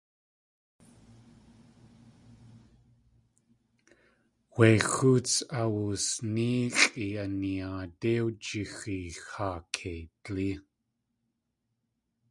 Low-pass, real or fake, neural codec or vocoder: 10.8 kHz; real; none